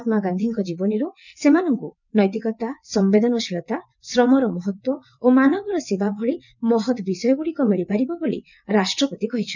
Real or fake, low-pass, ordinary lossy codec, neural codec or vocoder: fake; 7.2 kHz; none; vocoder, 22.05 kHz, 80 mel bands, WaveNeXt